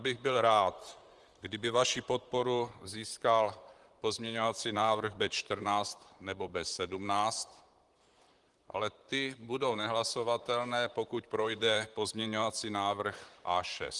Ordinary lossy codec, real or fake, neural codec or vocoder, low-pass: Opus, 24 kbps; fake; vocoder, 44.1 kHz, 128 mel bands, Pupu-Vocoder; 10.8 kHz